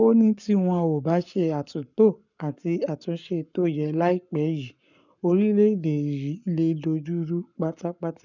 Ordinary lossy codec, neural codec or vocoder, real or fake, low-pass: none; codec, 44.1 kHz, 7.8 kbps, Pupu-Codec; fake; 7.2 kHz